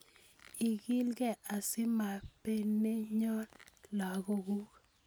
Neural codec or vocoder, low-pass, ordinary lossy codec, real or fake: none; none; none; real